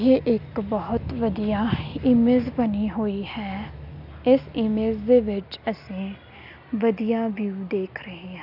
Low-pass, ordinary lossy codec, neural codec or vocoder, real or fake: 5.4 kHz; none; none; real